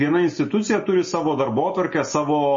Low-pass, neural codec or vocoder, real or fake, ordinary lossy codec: 7.2 kHz; none; real; MP3, 32 kbps